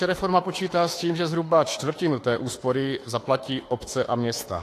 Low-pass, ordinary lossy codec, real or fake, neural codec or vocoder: 14.4 kHz; AAC, 48 kbps; fake; autoencoder, 48 kHz, 32 numbers a frame, DAC-VAE, trained on Japanese speech